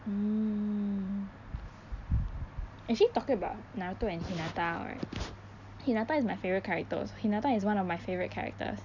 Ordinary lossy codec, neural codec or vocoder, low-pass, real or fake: none; none; 7.2 kHz; real